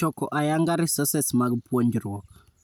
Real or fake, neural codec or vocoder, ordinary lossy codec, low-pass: real; none; none; none